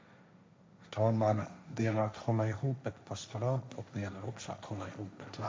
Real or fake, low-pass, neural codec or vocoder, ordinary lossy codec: fake; none; codec, 16 kHz, 1.1 kbps, Voila-Tokenizer; none